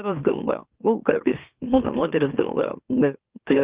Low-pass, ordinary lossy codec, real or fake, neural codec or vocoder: 3.6 kHz; Opus, 32 kbps; fake; autoencoder, 44.1 kHz, a latent of 192 numbers a frame, MeloTTS